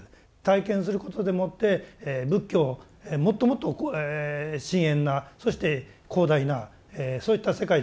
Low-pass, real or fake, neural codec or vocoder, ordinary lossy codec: none; real; none; none